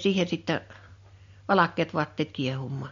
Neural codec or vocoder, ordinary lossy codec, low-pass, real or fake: none; MP3, 48 kbps; 7.2 kHz; real